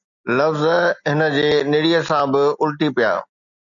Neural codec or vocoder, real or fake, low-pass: none; real; 7.2 kHz